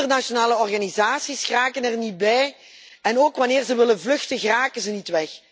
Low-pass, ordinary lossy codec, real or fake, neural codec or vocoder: none; none; real; none